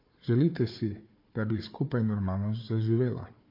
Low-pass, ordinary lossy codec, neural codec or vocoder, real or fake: 5.4 kHz; MP3, 32 kbps; codec, 16 kHz, 4 kbps, FunCodec, trained on Chinese and English, 50 frames a second; fake